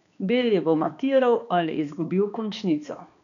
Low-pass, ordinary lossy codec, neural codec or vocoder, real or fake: 7.2 kHz; none; codec, 16 kHz, 2 kbps, X-Codec, HuBERT features, trained on balanced general audio; fake